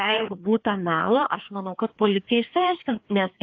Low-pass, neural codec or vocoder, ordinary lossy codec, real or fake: 7.2 kHz; codec, 16 kHz, 2 kbps, FreqCodec, larger model; AAC, 48 kbps; fake